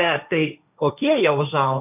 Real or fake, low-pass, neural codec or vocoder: fake; 3.6 kHz; codec, 16 kHz, 1.1 kbps, Voila-Tokenizer